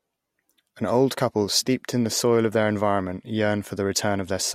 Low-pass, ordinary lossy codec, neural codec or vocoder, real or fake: 19.8 kHz; MP3, 64 kbps; none; real